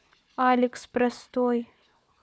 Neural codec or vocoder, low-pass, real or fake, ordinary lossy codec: codec, 16 kHz, 4 kbps, FunCodec, trained on Chinese and English, 50 frames a second; none; fake; none